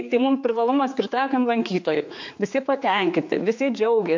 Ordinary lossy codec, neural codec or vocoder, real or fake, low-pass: MP3, 48 kbps; codec, 16 kHz, 4 kbps, X-Codec, HuBERT features, trained on general audio; fake; 7.2 kHz